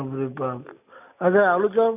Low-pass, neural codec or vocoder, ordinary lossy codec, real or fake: 3.6 kHz; none; none; real